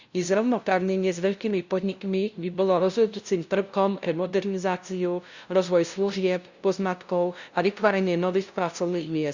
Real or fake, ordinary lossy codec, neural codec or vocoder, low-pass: fake; Opus, 64 kbps; codec, 16 kHz, 0.5 kbps, FunCodec, trained on LibriTTS, 25 frames a second; 7.2 kHz